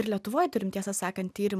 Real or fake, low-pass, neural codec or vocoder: real; 14.4 kHz; none